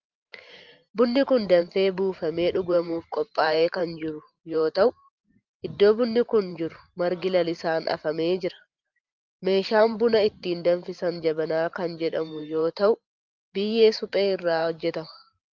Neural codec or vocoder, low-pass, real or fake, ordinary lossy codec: vocoder, 44.1 kHz, 128 mel bands every 512 samples, BigVGAN v2; 7.2 kHz; fake; Opus, 32 kbps